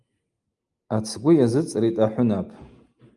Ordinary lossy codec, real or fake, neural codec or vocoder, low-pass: Opus, 32 kbps; real; none; 10.8 kHz